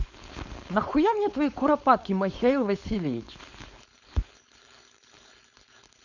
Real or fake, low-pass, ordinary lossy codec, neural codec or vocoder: fake; 7.2 kHz; none; codec, 16 kHz, 4.8 kbps, FACodec